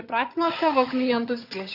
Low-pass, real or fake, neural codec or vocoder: 5.4 kHz; fake; vocoder, 22.05 kHz, 80 mel bands, HiFi-GAN